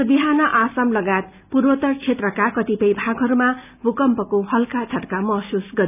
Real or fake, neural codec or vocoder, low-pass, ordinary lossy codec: real; none; 3.6 kHz; none